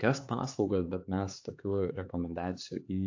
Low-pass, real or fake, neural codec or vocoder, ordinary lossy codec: 7.2 kHz; fake; codec, 16 kHz, 4 kbps, X-Codec, HuBERT features, trained on LibriSpeech; AAC, 48 kbps